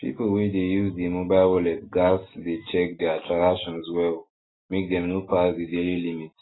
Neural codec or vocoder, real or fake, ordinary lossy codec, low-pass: none; real; AAC, 16 kbps; 7.2 kHz